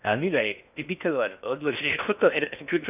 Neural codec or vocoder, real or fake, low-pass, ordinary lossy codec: codec, 16 kHz in and 24 kHz out, 0.6 kbps, FocalCodec, streaming, 4096 codes; fake; 3.6 kHz; none